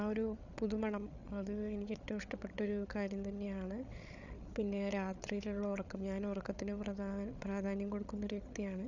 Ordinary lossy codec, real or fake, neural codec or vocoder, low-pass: none; fake; codec, 16 kHz, 8 kbps, FreqCodec, larger model; 7.2 kHz